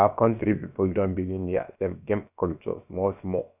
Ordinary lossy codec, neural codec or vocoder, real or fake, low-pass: none; codec, 16 kHz, about 1 kbps, DyCAST, with the encoder's durations; fake; 3.6 kHz